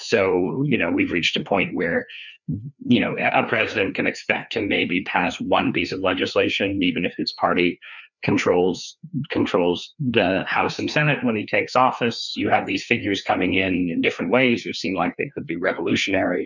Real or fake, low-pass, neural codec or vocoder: fake; 7.2 kHz; codec, 16 kHz, 2 kbps, FreqCodec, larger model